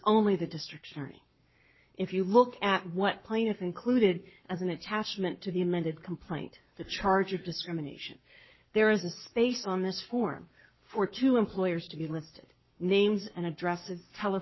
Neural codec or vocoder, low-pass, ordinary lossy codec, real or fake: codec, 44.1 kHz, 7.8 kbps, Pupu-Codec; 7.2 kHz; MP3, 24 kbps; fake